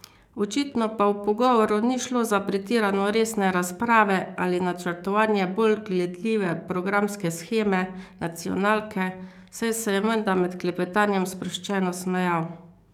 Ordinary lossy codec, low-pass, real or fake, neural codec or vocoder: none; 19.8 kHz; fake; codec, 44.1 kHz, 7.8 kbps, DAC